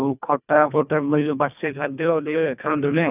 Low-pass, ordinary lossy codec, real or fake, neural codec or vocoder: 3.6 kHz; none; fake; codec, 24 kHz, 1.5 kbps, HILCodec